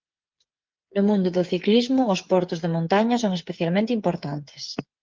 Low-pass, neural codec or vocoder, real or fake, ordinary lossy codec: 7.2 kHz; codec, 16 kHz, 8 kbps, FreqCodec, smaller model; fake; Opus, 32 kbps